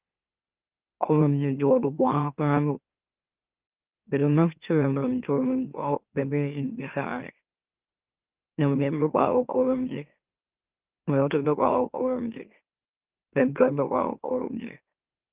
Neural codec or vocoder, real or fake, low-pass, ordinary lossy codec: autoencoder, 44.1 kHz, a latent of 192 numbers a frame, MeloTTS; fake; 3.6 kHz; Opus, 32 kbps